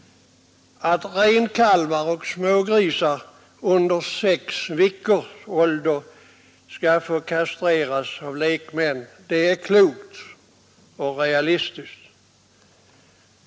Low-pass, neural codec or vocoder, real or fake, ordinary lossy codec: none; none; real; none